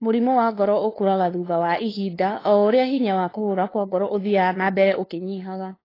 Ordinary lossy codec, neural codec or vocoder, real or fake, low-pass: AAC, 24 kbps; codec, 16 kHz, 2 kbps, FunCodec, trained on LibriTTS, 25 frames a second; fake; 5.4 kHz